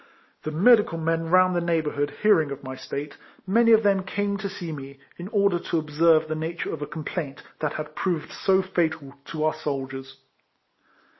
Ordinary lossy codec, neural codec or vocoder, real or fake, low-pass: MP3, 24 kbps; none; real; 7.2 kHz